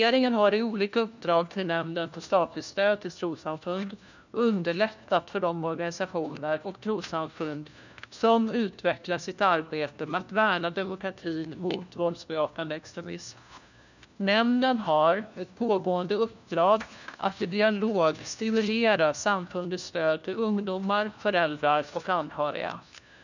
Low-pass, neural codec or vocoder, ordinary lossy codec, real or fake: 7.2 kHz; codec, 16 kHz, 1 kbps, FunCodec, trained on LibriTTS, 50 frames a second; none; fake